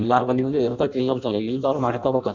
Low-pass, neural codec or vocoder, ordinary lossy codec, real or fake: 7.2 kHz; codec, 16 kHz in and 24 kHz out, 0.6 kbps, FireRedTTS-2 codec; none; fake